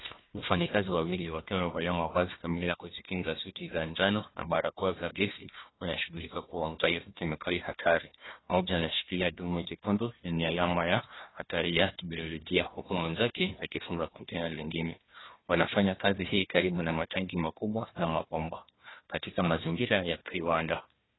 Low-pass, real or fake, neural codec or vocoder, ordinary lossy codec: 7.2 kHz; fake; codec, 16 kHz, 1 kbps, FunCodec, trained on Chinese and English, 50 frames a second; AAC, 16 kbps